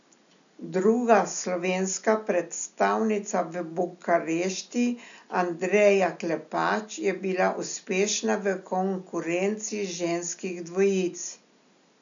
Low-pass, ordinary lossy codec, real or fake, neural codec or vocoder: 7.2 kHz; none; real; none